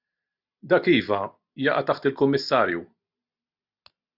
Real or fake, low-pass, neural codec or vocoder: real; 5.4 kHz; none